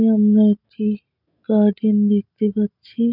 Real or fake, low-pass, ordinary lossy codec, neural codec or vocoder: real; 5.4 kHz; none; none